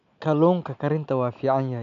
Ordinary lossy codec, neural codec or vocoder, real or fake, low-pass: none; none; real; 7.2 kHz